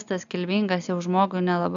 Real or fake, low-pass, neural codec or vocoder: real; 7.2 kHz; none